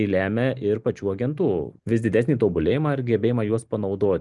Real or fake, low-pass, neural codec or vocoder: real; 10.8 kHz; none